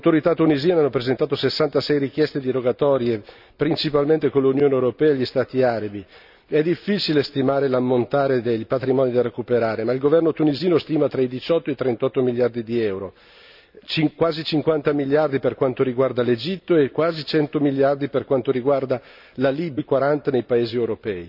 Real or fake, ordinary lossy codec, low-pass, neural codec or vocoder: real; none; 5.4 kHz; none